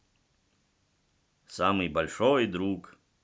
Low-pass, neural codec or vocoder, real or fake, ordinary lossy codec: none; none; real; none